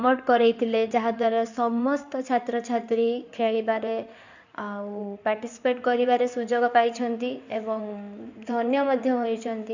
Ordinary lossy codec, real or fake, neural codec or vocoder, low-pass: none; fake; codec, 16 kHz in and 24 kHz out, 2.2 kbps, FireRedTTS-2 codec; 7.2 kHz